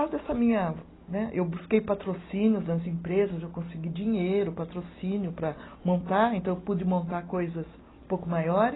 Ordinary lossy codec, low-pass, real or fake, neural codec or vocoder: AAC, 16 kbps; 7.2 kHz; real; none